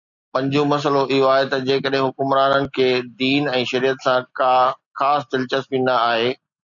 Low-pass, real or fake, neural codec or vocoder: 7.2 kHz; real; none